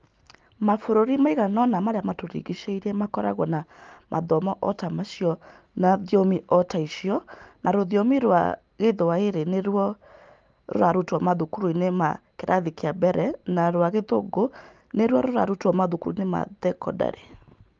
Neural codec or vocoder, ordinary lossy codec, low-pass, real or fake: none; Opus, 32 kbps; 7.2 kHz; real